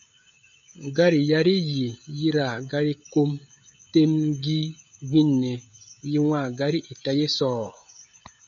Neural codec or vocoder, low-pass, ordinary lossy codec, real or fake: codec, 16 kHz, 16 kbps, FreqCodec, smaller model; 7.2 kHz; MP3, 96 kbps; fake